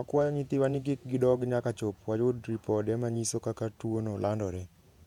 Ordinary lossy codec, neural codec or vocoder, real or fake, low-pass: MP3, 96 kbps; vocoder, 48 kHz, 128 mel bands, Vocos; fake; 19.8 kHz